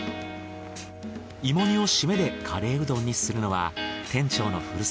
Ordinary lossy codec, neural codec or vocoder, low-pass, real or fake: none; none; none; real